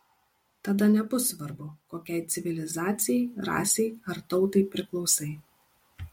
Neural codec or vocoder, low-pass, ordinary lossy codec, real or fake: none; 19.8 kHz; MP3, 64 kbps; real